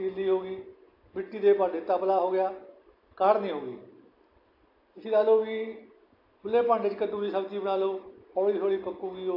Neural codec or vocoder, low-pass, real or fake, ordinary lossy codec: none; 5.4 kHz; real; AAC, 32 kbps